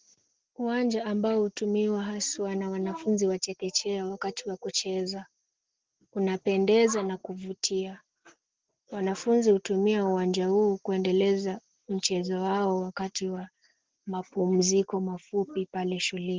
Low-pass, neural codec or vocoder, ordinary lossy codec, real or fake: 7.2 kHz; none; Opus, 16 kbps; real